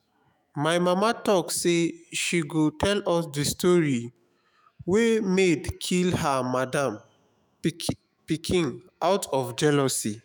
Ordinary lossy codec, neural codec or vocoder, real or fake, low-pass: none; autoencoder, 48 kHz, 128 numbers a frame, DAC-VAE, trained on Japanese speech; fake; none